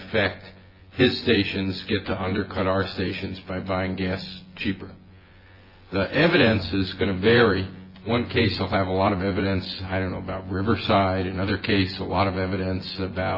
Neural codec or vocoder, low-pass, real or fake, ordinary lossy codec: vocoder, 24 kHz, 100 mel bands, Vocos; 5.4 kHz; fake; AAC, 32 kbps